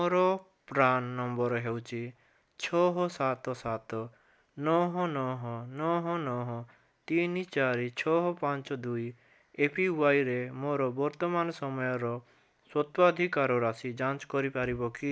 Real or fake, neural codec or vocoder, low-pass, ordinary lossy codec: real; none; none; none